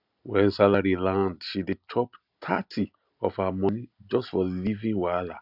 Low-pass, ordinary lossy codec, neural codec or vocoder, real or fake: 5.4 kHz; AAC, 48 kbps; none; real